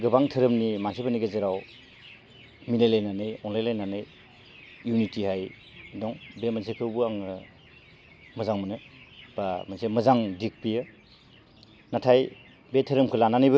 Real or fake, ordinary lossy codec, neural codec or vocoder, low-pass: real; none; none; none